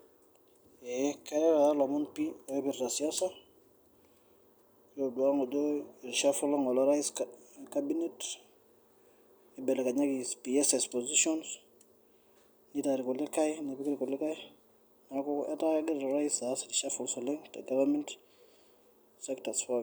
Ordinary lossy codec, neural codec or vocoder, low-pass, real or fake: none; none; none; real